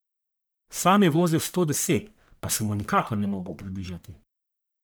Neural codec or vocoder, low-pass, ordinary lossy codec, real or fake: codec, 44.1 kHz, 1.7 kbps, Pupu-Codec; none; none; fake